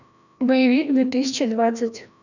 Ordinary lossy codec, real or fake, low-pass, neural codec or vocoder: none; fake; 7.2 kHz; codec, 16 kHz, 1 kbps, FreqCodec, larger model